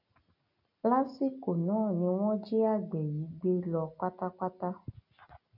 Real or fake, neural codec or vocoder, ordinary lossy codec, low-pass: real; none; none; 5.4 kHz